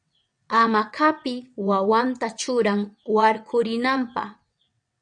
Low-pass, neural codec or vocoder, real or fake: 9.9 kHz; vocoder, 22.05 kHz, 80 mel bands, WaveNeXt; fake